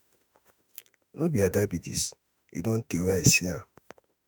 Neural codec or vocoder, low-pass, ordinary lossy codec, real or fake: autoencoder, 48 kHz, 32 numbers a frame, DAC-VAE, trained on Japanese speech; none; none; fake